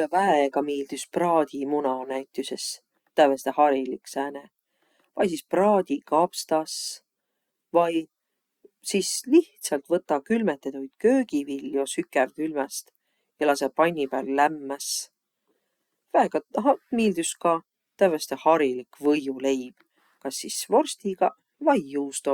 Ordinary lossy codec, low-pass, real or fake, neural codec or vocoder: Opus, 64 kbps; 19.8 kHz; real; none